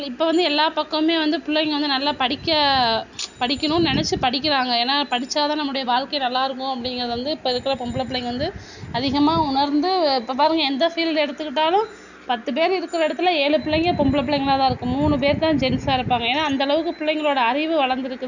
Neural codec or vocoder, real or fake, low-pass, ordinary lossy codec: none; real; 7.2 kHz; none